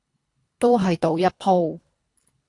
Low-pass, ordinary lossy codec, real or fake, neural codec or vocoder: 10.8 kHz; AAC, 48 kbps; fake; codec, 24 kHz, 3 kbps, HILCodec